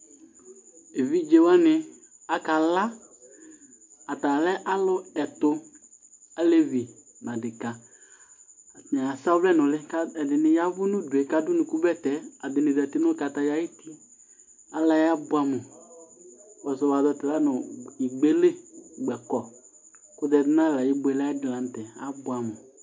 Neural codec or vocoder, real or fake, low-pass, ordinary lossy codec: none; real; 7.2 kHz; MP3, 48 kbps